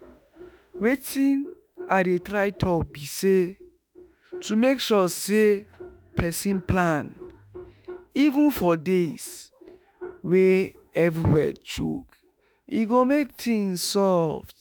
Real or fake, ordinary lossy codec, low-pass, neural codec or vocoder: fake; none; none; autoencoder, 48 kHz, 32 numbers a frame, DAC-VAE, trained on Japanese speech